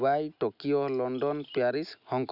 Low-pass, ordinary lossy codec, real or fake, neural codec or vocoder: 5.4 kHz; none; real; none